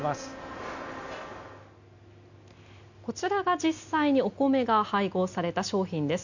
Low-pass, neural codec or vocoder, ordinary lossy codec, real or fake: 7.2 kHz; none; none; real